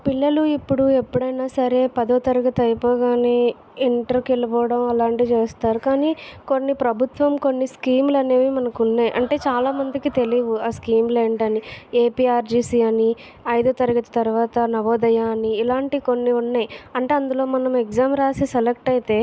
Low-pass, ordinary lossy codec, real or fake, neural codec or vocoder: none; none; real; none